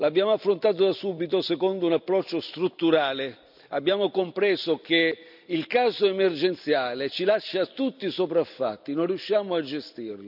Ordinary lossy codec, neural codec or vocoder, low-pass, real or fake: none; none; 5.4 kHz; real